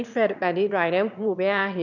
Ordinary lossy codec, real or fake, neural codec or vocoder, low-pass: none; fake; autoencoder, 22.05 kHz, a latent of 192 numbers a frame, VITS, trained on one speaker; 7.2 kHz